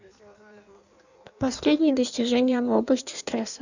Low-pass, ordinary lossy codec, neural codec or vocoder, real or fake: 7.2 kHz; none; codec, 16 kHz in and 24 kHz out, 1.1 kbps, FireRedTTS-2 codec; fake